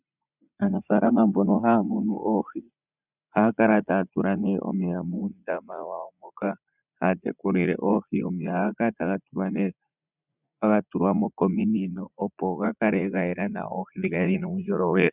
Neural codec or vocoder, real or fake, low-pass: vocoder, 44.1 kHz, 80 mel bands, Vocos; fake; 3.6 kHz